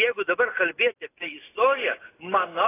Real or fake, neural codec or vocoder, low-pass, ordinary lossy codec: real; none; 3.6 kHz; AAC, 16 kbps